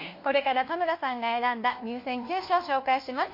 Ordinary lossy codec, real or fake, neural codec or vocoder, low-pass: MP3, 32 kbps; fake; codec, 16 kHz, 1 kbps, FunCodec, trained on LibriTTS, 50 frames a second; 5.4 kHz